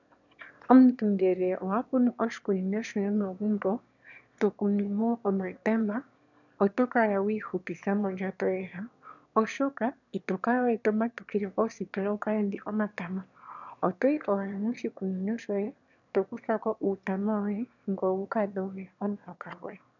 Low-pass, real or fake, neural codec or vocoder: 7.2 kHz; fake; autoencoder, 22.05 kHz, a latent of 192 numbers a frame, VITS, trained on one speaker